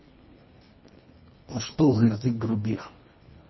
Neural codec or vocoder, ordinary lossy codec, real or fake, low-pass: codec, 24 kHz, 1.5 kbps, HILCodec; MP3, 24 kbps; fake; 7.2 kHz